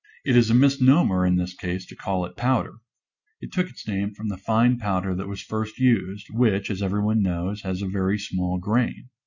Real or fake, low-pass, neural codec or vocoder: real; 7.2 kHz; none